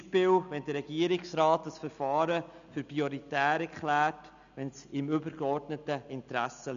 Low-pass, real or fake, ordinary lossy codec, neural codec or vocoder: 7.2 kHz; real; none; none